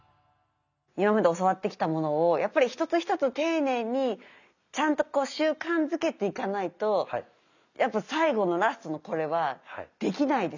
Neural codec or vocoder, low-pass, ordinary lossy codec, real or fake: none; 7.2 kHz; none; real